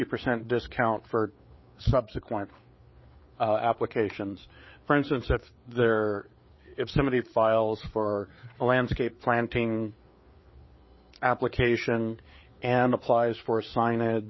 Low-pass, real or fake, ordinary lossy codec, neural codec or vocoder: 7.2 kHz; fake; MP3, 24 kbps; codec, 16 kHz, 4 kbps, FreqCodec, larger model